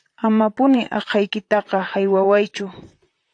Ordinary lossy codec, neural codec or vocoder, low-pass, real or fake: AAC, 48 kbps; vocoder, 44.1 kHz, 128 mel bands, Pupu-Vocoder; 9.9 kHz; fake